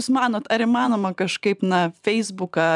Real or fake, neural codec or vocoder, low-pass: fake; vocoder, 44.1 kHz, 128 mel bands every 512 samples, BigVGAN v2; 10.8 kHz